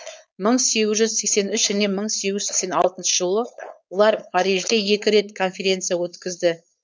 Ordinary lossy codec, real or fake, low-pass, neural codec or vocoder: none; fake; none; codec, 16 kHz, 4.8 kbps, FACodec